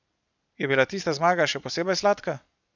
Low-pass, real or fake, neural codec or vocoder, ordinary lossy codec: 7.2 kHz; real; none; none